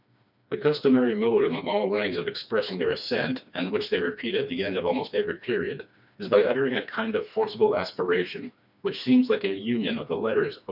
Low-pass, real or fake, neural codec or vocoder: 5.4 kHz; fake; codec, 16 kHz, 2 kbps, FreqCodec, smaller model